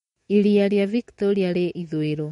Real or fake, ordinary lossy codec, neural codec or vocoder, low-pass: fake; MP3, 48 kbps; autoencoder, 48 kHz, 128 numbers a frame, DAC-VAE, trained on Japanese speech; 19.8 kHz